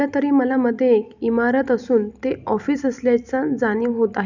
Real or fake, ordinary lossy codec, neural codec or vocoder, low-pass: real; none; none; 7.2 kHz